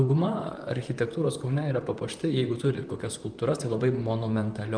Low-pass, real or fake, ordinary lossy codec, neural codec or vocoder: 9.9 kHz; fake; Opus, 32 kbps; vocoder, 44.1 kHz, 128 mel bands, Pupu-Vocoder